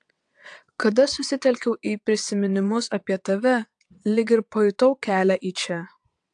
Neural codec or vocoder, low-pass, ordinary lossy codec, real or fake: vocoder, 22.05 kHz, 80 mel bands, WaveNeXt; 9.9 kHz; AAC, 64 kbps; fake